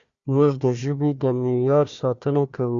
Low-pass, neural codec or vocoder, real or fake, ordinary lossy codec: 7.2 kHz; codec, 16 kHz, 1 kbps, FunCodec, trained on Chinese and English, 50 frames a second; fake; Opus, 64 kbps